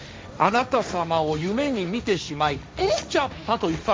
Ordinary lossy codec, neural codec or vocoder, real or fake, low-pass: none; codec, 16 kHz, 1.1 kbps, Voila-Tokenizer; fake; none